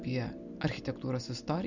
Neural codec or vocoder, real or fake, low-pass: none; real; 7.2 kHz